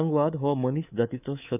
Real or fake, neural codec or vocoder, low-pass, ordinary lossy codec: fake; codec, 24 kHz, 3.1 kbps, DualCodec; 3.6 kHz; none